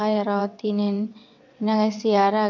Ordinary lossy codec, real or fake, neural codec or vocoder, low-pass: none; fake; vocoder, 22.05 kHz, 80 mel bands, WaveNeXt; 7.2 kHz